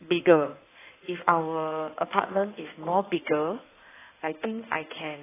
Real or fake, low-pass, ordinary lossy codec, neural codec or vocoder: fake; 3.6 kHz; AAC, 16 kbps; codec, 16 kHz in and 24 kHz out, 1.1 kbps, FireRedTTS-2 codec